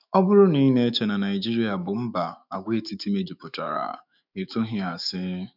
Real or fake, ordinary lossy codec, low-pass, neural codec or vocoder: fake; none; 5.4 kHz; autoencoder, 48 kHz, 128 numbers a frame, DAC-VAE, trained on Japanese speech